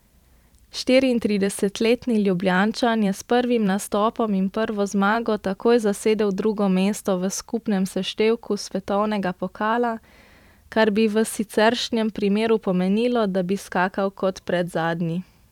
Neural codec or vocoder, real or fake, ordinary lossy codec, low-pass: vocoder, 44.1 kHz, 128 mel bands every 512 samples, BigVGAN v2; fake; none; 19.8 kHz